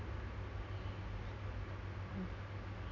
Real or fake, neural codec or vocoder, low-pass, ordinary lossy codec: real; none; 7.2 kHz; none